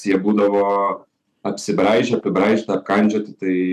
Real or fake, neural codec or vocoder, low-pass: real; none; 14.4 kHz